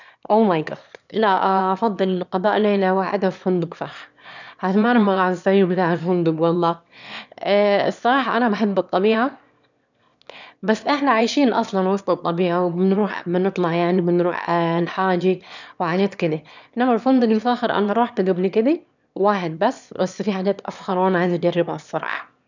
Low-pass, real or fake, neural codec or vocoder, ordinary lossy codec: 7.2 kHz; fake; autoencoder, 22.05 kHz, a latent of 192 numbers a frame, VITS, trained on one speaker; none